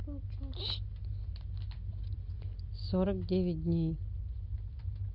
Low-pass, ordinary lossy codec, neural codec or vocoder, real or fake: 5.4 kHz; Opus, 24 kbps; none; real